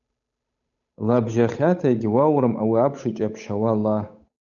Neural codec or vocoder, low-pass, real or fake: codec, 16 kHz, 8 kbps, FunCodec, trained on Chinese and English, 25 frames a second; 7.2 kHz; fake